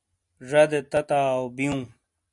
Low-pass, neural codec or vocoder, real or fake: 10.8 kHz; none; real